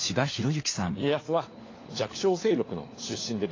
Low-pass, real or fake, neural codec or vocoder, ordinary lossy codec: 7.2 kHz; fake; codec, 16 kHz, 4 kbps, FunCodec, trained on LibriTTS, 50 frames a second; AAC, 32 kbps